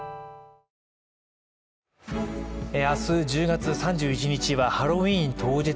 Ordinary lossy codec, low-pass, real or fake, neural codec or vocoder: none; none; real; none